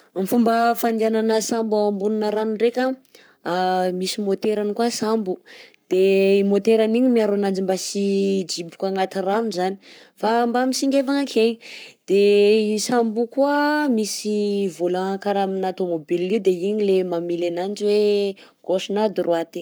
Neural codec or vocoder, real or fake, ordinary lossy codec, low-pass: codec, 44.1 kHz, 7.8 kbps, Pupu-Codec; fake; none; none